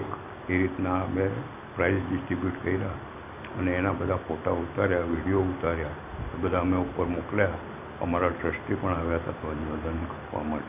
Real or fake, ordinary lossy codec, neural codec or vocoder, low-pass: real; AAC, 32 kbps; none; 3.6 kHz